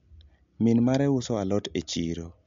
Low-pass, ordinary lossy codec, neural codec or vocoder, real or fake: 7.2 kHz; MP3, 64 kbps; none; real